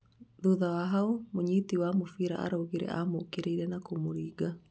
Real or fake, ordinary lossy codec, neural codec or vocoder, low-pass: real; none; none; none